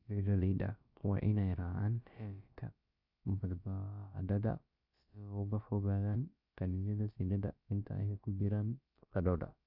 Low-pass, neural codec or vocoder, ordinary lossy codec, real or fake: 5.4 kHz; codec, 16 kHz, about 1 kbps, DyCAST, with the encoder's durations; Opus, 64 kbps; fake